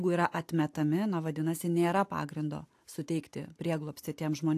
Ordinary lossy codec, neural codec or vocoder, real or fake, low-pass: AAC, 64 kbps; none; real; 14.4 kHz